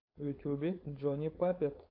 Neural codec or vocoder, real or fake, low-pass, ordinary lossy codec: codec, 16 kHz, 4.8 kbps, FACodec; fake; 5.4 kHz; AAC, 32 kbps